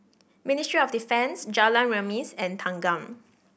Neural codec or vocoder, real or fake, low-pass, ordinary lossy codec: none; real; none; none